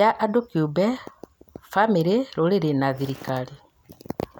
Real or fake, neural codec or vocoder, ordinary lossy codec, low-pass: real; none; none; none